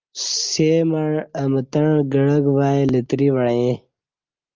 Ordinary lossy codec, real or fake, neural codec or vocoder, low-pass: Opus, 24 kbps; real; none; 7.2 kHz